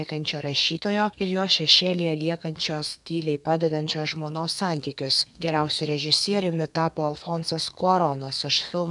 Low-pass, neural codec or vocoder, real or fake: 10.8 kHz; codec, 32 kHz, 1.9 kbps, SNAC; fake